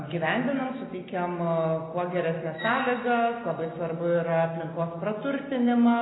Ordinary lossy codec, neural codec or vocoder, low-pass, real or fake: AAC, 16 kbps; none; 7.2 kHz; real